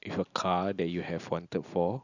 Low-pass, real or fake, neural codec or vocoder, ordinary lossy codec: 7.2 kHz; real; none; none